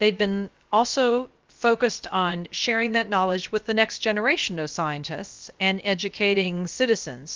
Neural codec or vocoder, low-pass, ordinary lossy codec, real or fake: codec, 16 kHz, about 1 kbps, DyCAST, with the encoder's durations; 7.2 kHz; Opus, 32 kbps; fake